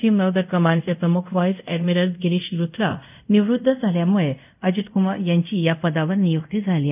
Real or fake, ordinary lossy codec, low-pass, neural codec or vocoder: fake; none; 3.6 kHz; codec, 24 kHz, 0.5 kbps, DualCodec